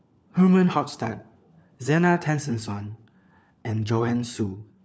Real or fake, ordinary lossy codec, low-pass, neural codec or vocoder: fake; none; none; codec, 16 kHz, 4 kbps, FunCodec, trained on LibriTTS, 50 frames a second